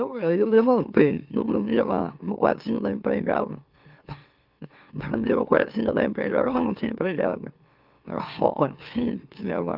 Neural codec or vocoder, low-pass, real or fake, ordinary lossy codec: autoencoder, 44.1 kHz, a latent of 192 numbers a frame, MeloTTS; 5.4 kHz; fake; Opus, 24 kbps